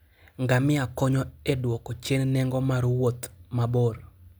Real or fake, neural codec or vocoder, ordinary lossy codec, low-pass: real; none; none; none